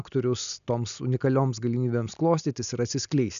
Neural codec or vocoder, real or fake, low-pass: none; real; 7.2 kHz